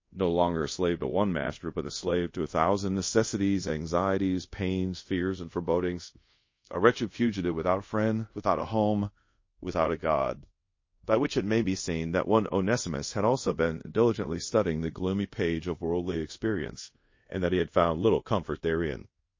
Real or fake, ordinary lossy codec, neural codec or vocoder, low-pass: fake; MP3, 32 kbps; codec, 24 kHz, 0.5 kbps, DualCodec; 7.2 kHz